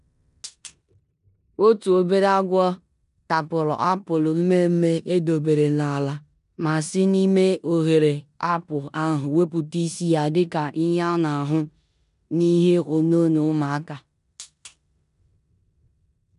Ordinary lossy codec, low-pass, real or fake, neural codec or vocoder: none; 10.8 kHz; fake; codec, 16 kHz in and 24 kHz out, 0.9 kbps, LongCat-Audio-Codec, four codebook decoder